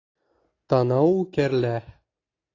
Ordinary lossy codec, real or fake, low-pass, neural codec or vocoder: AAC, 32 kbps; real; 7.2 kHz; none